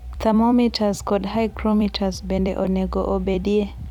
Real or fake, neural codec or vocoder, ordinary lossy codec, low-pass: fake; vocoder, 44.1 kHz, 128 mel bands every 256 samples, BigVGAN v2; none; 19.8 kHz